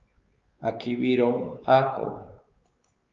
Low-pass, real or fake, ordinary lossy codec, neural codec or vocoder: 7.2 kHz; fake; Opus, 32 kbps; codec, 16 kHz, 4 kbps, X-Codec, WavLM features, trained on Multilingual LibriSpeech